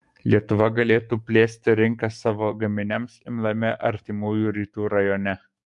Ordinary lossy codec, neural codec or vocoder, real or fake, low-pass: MP3, 64 kbps; codec, 44.1 kHz, 7.8 kbps, DAC; fake; 10.8 kHz